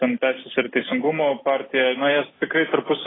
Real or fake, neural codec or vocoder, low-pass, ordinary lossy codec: real; none; 7.2 kHz; AAC, 16 kbps